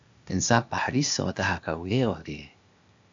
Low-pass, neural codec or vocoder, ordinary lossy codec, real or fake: 7.2 kHz; codec, 16 kHz, 0.8 kbps, ZipCodec; AAC, 64 kbps; fake